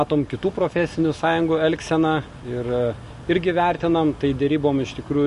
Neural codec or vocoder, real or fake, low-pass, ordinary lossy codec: none; real; 14.4 kHz; MP3, 48 kbps